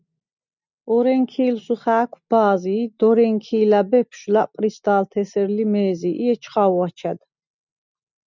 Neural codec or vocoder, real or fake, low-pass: none; real; 7.2 kHz